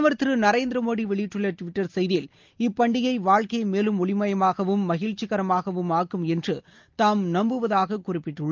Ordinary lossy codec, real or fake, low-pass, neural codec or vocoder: Opus, 24 kbps; real; 7.2 kHz; none